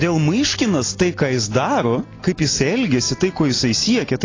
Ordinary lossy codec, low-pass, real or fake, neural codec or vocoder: AAC, 32 kbps; 7.2 kHz; real; none